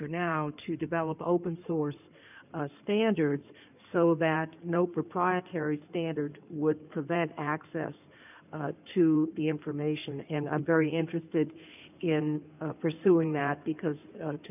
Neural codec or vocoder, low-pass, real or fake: codec, 16 kHz in and 24 kHz out, 2.2 kbps, FireRedTTS-2 codec; 3.6 kHz; fake